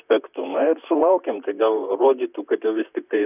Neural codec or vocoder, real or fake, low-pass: vocoder, 44.1 kHz, 128 mel bands, Pupu-Vocoder; fake; 3.6 kHz